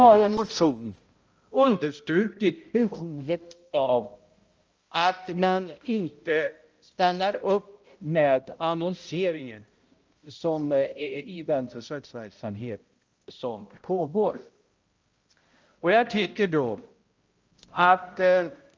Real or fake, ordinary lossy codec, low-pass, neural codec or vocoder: fake; Opus, 24 kbps; 7.2 kHz; codec, 16 kHz, 0.5 kbps, X-Codec, HuBERT features, trained on balanced general audio